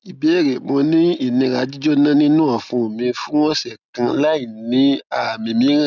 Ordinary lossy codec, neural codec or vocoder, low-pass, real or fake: none; none; 7.2 kHz; real